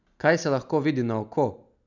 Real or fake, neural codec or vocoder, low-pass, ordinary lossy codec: real; none; 7.2 kHz; none